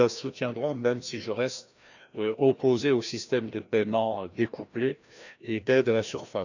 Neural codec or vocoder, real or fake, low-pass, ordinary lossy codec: codec, 16 kHz, 1 kbps, FreqCodec, larger model; fake; 7.2 kHz; none